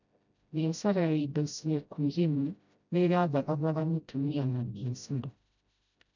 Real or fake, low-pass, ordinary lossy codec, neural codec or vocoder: fake; 7.2 kHz; none; codec, 16 kHz, 0.5 kbps, FreqCodec, smaller model